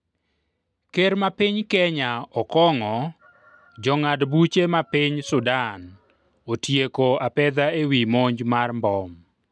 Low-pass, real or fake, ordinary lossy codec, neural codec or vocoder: none; real; none; none